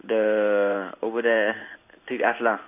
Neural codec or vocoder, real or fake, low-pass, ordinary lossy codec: codec, 16 kHz in and 24 kHz out, 1 kbps, XY-Tokenizer; fake; 3.6 kHz; none